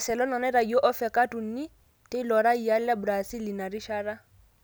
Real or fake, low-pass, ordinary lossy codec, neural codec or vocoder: real; none; none; none